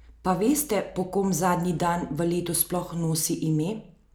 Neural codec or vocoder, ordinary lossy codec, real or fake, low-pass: none; none; real; none